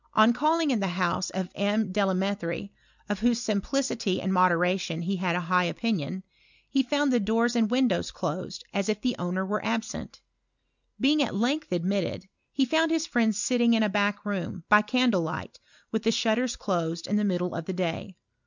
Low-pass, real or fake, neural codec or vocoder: 7.2 kHz; real; none